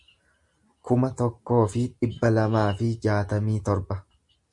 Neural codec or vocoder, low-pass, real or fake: none; 10.8 kHz; real